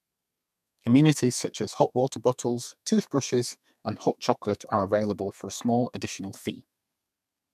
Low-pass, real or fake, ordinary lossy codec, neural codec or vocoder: 14.4 kHz; fake; none; codec, 32 kHz, 1.9 kbps, SNAC